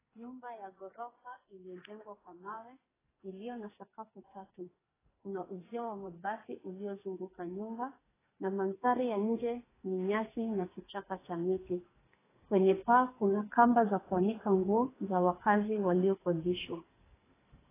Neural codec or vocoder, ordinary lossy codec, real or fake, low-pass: codec, 44.1 kHz, 2.6 kbps, SNAC; AAC, 16 kbps; fake; 3.6 kHz